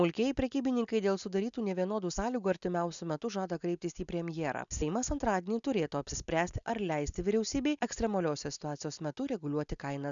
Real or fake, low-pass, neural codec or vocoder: real; 7.2 kHz; none